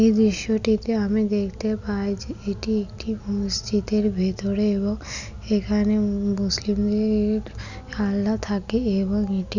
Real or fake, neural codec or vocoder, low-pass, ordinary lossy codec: real; none; 7.2 kHz; none